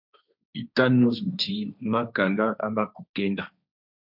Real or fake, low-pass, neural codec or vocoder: fake; 5.4 kHz; codec, 16 kHz, 1.1 kbps, Voila-Tokenizer